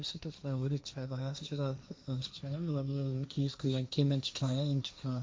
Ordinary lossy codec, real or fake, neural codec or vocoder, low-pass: none; fake; codec, 16 kHz, 1.1 kbps, Voila-Tokenizer; none